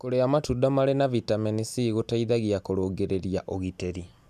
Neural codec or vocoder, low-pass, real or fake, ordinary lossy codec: none; 14.4 kHz; real; none